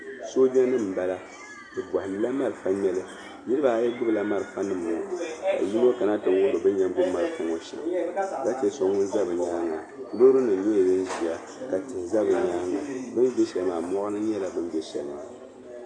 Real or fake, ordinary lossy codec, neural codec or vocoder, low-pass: real; AAC, 48 kbps; none; 9.9 kHz